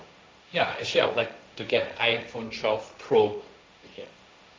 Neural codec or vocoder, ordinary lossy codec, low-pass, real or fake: codec, 16 kHz, 1.1 kbps, Voila-Tokenizer; none; none; fake